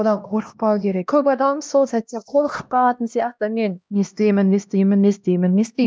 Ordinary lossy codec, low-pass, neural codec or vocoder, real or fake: none; none; codec, 16 kHz, 1 kbps, X-Codec, HuBERT features, trained on LibriSpeech; fake